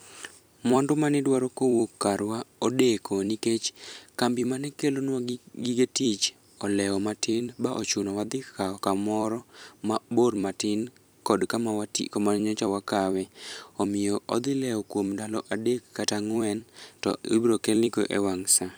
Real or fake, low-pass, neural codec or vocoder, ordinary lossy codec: fake; none; vocoder, 44.1 kHz, 128 mel bands every 256 samples, BigVGAN v2; none